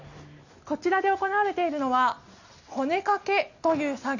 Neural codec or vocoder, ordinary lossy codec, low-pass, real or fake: none; none; 7.2 kHz; real